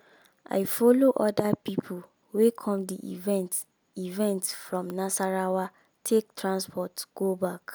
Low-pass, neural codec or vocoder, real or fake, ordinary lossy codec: none; none; real; none